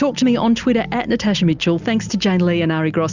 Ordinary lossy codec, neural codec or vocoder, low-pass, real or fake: Opus, 64 kbps; none; 7.2 kHz; real